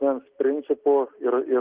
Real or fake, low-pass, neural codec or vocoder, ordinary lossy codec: real; 3.6 kHz; none; Opus, 16 kbps